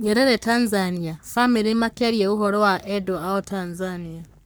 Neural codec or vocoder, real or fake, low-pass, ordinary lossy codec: codec, 44.1 kHz, 3.4 kbps, Pupu-Codec; fake; none; none